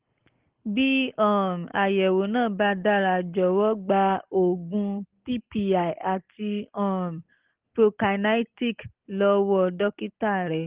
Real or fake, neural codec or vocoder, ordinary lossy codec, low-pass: real; none; Opus, 16 kbps; 3.6 kHz